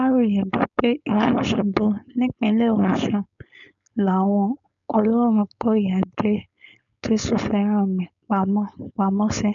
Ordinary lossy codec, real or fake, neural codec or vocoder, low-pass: none; fake; codec, 16 kHz, 4.8 kbps, FACodec; 7.2 kHz